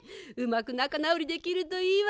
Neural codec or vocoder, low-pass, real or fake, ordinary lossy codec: none; none; real; none